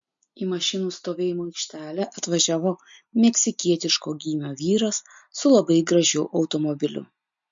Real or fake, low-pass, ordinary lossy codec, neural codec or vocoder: real; 7.2 kHz; MP3, 48 kbps; none